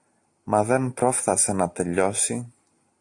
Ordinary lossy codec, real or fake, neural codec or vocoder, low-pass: AAC, 64 kbps; real; none; 10.8 kHz